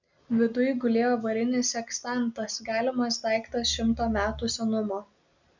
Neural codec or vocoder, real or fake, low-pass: none; real; 7.2 kHz